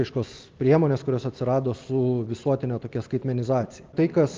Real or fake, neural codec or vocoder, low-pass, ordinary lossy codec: real; none; 7.2 kHz; Opus, 24 kbps